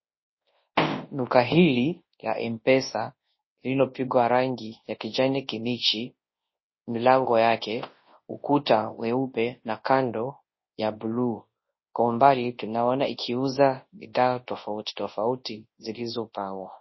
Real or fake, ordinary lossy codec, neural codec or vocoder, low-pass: fake; MP3, 24 kbps; codec, 24 kHz, 0.9 kbps, WavTokenizer, large speech release; 7.2 kHz